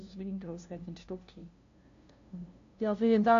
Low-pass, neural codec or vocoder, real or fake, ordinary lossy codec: 7.2 kHz; codec, 16 kHz, 0.5 kbps, FunCodec, trained on LibriTTS, 25 frames a second; fake; AAC, 48 kbps